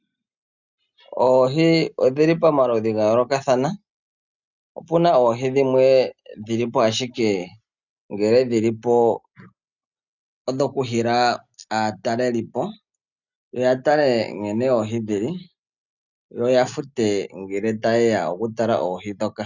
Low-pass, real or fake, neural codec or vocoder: 7.2 kHz; real; none